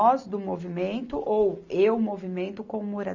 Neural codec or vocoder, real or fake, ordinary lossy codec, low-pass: none; real; none; 7.2 kHz